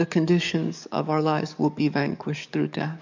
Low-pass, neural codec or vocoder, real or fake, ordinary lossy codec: 7.2 kHz; codec, 44.1 kHz, 7.8 kbps, DAC; fake; MP3, 64 kbps